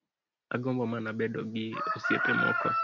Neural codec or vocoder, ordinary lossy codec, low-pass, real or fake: none; MP3, 64 kbps; 7.2 kHz; real